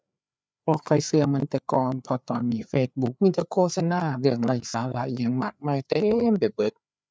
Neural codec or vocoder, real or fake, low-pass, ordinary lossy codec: codec, 16 kHz, 4 kbps, FreqCodec, larger model; fake; none; none